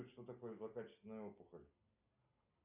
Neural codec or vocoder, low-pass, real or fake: none; 3.6 kHz; real